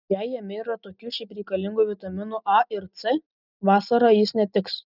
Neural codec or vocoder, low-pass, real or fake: none; 5.4 kHz; real